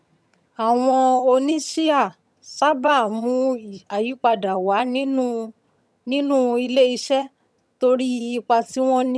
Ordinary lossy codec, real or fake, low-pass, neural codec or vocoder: none; fake; none; vocoder, 22.05 kHz, 80 mel bands, HiFi-GAN